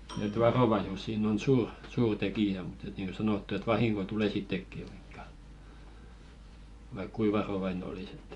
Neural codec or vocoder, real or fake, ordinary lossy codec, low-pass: vocoder, 24 kHz, 100 mel bands, Vocos; fake; Opus, 64 kbps; 10.8 kHz